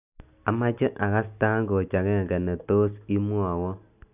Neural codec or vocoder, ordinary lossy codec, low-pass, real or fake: none; none; 3.6 kHz; real